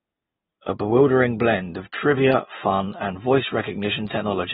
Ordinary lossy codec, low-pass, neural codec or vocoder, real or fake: AAC, 16 kbps; 19.8 kHz; none; real